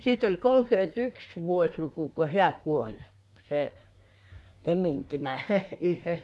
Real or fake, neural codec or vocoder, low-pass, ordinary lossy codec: fake; codec, 24 kHz, 1 kbps, SNAC; none; none